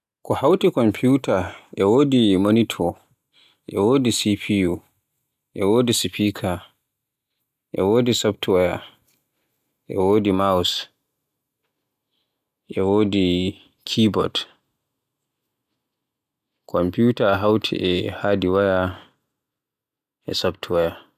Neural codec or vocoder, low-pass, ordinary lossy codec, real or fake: none; 14.4 kHz; none; real